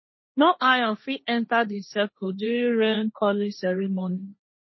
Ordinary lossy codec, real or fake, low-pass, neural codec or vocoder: MP3, 24 kbps; fake; 7.2 kHz; codec, 16 kHz, 1.1 kbps, Voila-Tokenizer